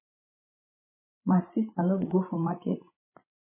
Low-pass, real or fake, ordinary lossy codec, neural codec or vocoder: 3.6 kHz; fake; MP3, 16 kbps; codec, 16 kHz, 16 kbps, FreqCodec, larger model